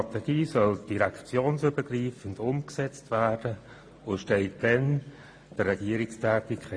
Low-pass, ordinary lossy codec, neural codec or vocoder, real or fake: 9.9 kHz; AAC, 48 kbps; none; real